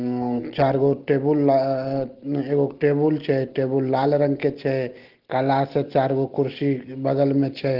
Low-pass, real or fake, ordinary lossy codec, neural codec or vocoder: 5.4 kHz; real; Opus, 16 kbps; none